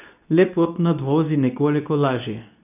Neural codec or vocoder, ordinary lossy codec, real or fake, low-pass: codec, 24 kHz, 0.9 kbps, WavTokenizer, medium speech release version 2; none; fake; 3.6 kHz